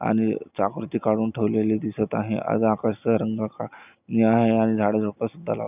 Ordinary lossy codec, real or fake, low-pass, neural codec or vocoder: none; real; 3.6 kHz; none